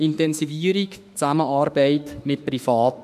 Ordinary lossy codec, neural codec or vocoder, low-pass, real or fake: none; autoencoder, 48 kHz, 32 numbers a frame, DAC-VAE, trained on Japanese speech; 14.4 kHz; fake